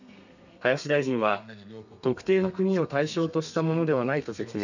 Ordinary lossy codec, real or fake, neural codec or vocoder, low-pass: none; fake; codec, 32 kHz, 1.9 kbps, SNAC; 7.2 kHz